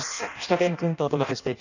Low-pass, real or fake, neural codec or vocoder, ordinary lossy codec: 7.2 kHz; fake; codec, 16 kHz in and 24 kHz out, 0.6 kbps, FireRedTTS-2 codec; none